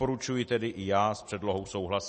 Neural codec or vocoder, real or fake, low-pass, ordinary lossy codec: none; real; 10.8 kHz; MP3, 32 kbps